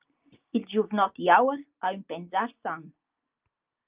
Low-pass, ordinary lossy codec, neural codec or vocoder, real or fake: 3.6 kHz; Opus, 24 kbps; none; real